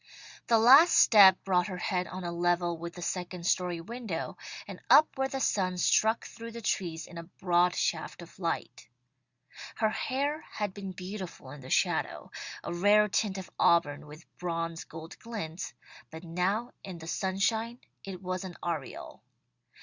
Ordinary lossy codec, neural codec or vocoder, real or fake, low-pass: Opus, 64 kbps; none; real; 7.2 kHz